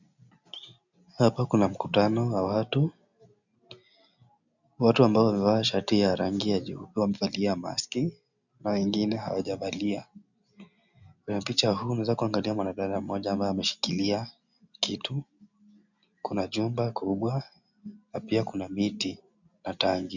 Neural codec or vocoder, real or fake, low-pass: none; real; 7.2 kHz